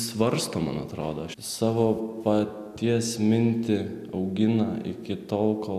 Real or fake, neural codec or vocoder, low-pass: real; none; 14.4 kHz